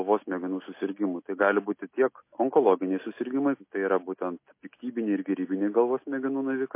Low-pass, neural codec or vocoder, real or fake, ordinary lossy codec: 3.6 kHz; none; real; MP3, 24 kbps